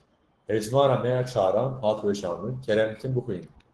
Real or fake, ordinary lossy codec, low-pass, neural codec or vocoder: fake; Opus, 16 kbps; 10.8 kHz; codec, 44.1 kHz, 7.8 kbps, Pupu-Codec